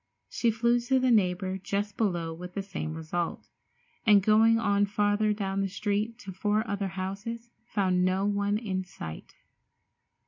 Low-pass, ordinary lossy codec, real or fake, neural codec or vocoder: 7.2 kHz; MP3, 48 kbps; real; none